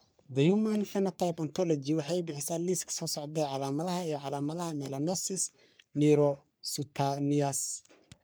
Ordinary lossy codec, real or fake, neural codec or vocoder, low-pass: none; fake; codec, 44.1 kHz, 3.4 kbps, Pupu-Codec; none